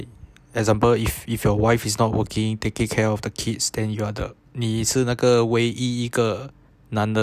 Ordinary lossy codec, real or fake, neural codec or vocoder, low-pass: none; real; none; 14.4 kHz